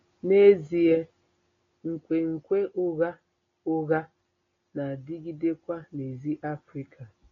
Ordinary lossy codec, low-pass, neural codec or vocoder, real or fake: AAC, 32 kbps; 7.2 kHz; none; real